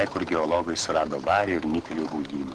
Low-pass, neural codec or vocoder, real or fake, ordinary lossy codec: 10.8 kHz; codec, 44.1 kHz, 7.8 kbps, DAC; fake; Opus, 16 kbps